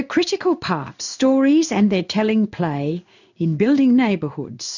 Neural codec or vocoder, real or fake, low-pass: codec, 16 kHz in and 24 kHz out, 1 kbps, XY-Tokenizer; fake; 7.2 kHz